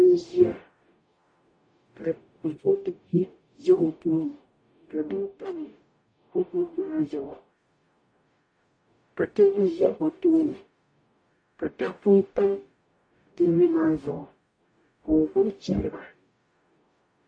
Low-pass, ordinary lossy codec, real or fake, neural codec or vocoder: 9.9 kHz; MP3, 48 kbps; fake; codec, 44.1 kHz, 0.9 kbps, DAC